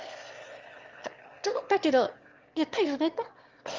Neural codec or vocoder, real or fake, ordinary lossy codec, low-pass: autoencoder, 22.05 kHz, a latent of 192 numbers a frame, VITS, trained on one speaker; fake; Opus, 32 kbps; 7.2 kHz